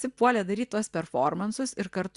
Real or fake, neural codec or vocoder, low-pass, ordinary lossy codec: real; none; 10.8 kHz; Opus, 64 kbps